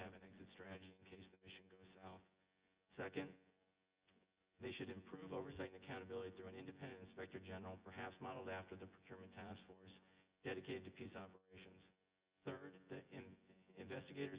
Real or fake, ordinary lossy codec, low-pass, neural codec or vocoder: fake; Opus, 32 kbps; 3.6 kHz; vocoder, 24 kHz, 100 mel bands, Vocos